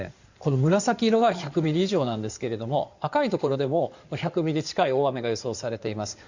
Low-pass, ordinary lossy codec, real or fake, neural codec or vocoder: 7.2 kHz; Opus, 64 kbps; fake; codec, 16 kHz in and 24 kHz out, 2.2 kbps, FireRedTTS-2 codec